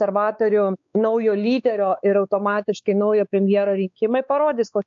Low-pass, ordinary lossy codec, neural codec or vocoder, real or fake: 7.2 kHz; AAC, 64 kbps; codec, 16 kHz, 4 kbps, X-Codec, WavLM features, trained on Multilingual LibriSpeech; fake